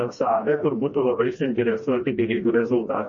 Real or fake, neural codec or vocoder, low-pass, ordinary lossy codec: fake; codec, 16 kHz, 1 kbps, FreqCodec, smaller model; 7.2 kHz; MP3, 32 kbps